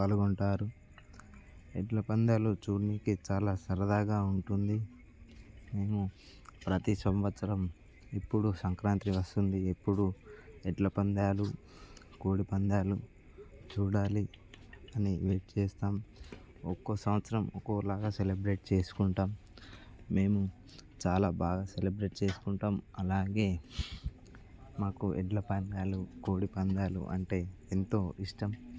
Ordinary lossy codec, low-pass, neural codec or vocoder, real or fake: none; none; none; real